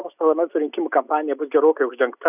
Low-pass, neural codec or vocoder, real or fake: 3.6 kHz; none; real